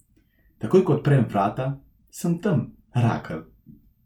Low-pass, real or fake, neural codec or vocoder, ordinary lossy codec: 19.8 kHz; real; none; none